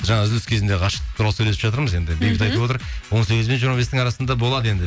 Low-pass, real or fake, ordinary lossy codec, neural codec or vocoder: none; real; none; none